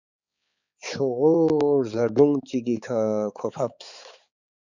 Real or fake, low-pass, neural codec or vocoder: fake; 7.2 kHz; codec, 16 kHz, 4 kbps, X-Codec, HuBERT features, trained on balanced general audio